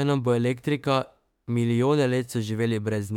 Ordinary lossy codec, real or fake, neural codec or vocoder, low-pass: MP3, 96 kbps; fake; autoencoder, 48 kHz, 32 numbers a frame, DAC-VAE, trained on Japanese speech; 19.8 kHz